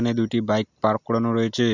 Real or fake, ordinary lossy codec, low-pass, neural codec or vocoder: real; none; 7.2 kHz; none